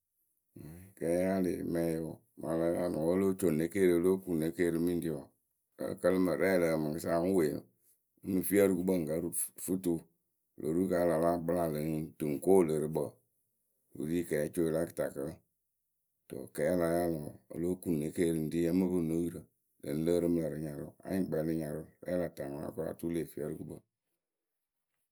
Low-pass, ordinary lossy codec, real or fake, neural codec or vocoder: none; none; real; none